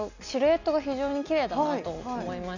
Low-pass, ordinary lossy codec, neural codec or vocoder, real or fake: 7.2 kHz; Opus, 64 kbps; none; real